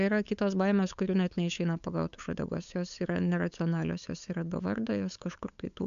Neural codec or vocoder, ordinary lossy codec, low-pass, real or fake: codec, 16 kHz, 8 kbps, FunCodec, trained on LibriTTS, 25 frames a second; MP3, 64 kbps; 7.2 kHz; fake